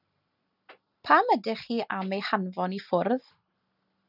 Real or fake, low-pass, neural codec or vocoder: real; 5.4 kHz; none